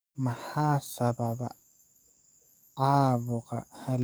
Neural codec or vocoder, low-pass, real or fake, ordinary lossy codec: codec, 44.1 kHz, 7.8 kbps, DAC; none; fake; none